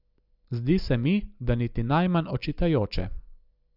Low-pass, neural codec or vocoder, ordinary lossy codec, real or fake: 5.4 kHz; none; none; real